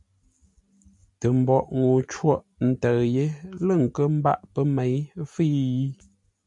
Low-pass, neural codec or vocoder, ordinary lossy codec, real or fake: 10.8 kHz; none; MP3, 64 kbps; real